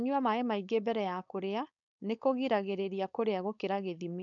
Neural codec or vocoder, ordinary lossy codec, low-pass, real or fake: codec, 16 kHz, 4.8 kbps, FACodec; none; 7.2 kHz; fake